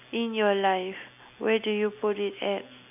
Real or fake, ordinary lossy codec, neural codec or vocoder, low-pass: real; none; none; 3.6 kHz